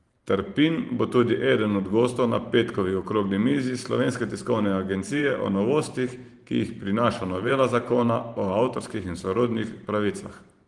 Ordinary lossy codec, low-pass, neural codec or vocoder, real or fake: Opus, 24 kbps; 10.8 kHz; vocoder, 44.1 kHz, 128 mel bands every 512 samples, BigVGAN v2; fake